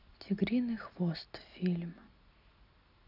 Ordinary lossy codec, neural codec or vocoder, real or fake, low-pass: none; none; real; 5.4 kHz